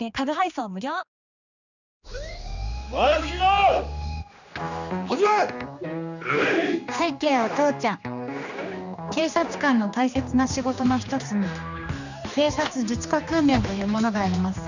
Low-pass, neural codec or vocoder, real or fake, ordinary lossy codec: 7.2 kHz; codec, 16 kHz, 2 kbps, X-Codec, HuBERT features, trained on general audio; fake; none